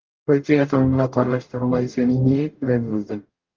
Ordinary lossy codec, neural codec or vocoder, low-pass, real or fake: Opus, 32 kbps; codec, 44.1 kHz, 0.9 kbps, DAC; 7.2 kHz; fake